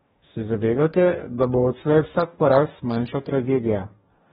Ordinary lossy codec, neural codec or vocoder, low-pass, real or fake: AAC, 16 kbps; codec, 44.1 kHz, 2.6 kbps, DAC; 19.8 kHz; fake